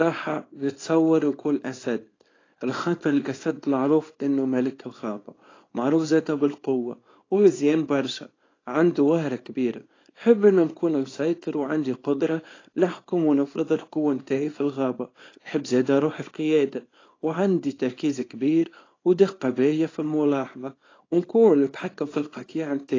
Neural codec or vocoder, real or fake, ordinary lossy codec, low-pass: codec, 24 kHz, 0.9 kbps, WavTokenizer, small release; fake; AAC, 32 kbps; 7.2 kHz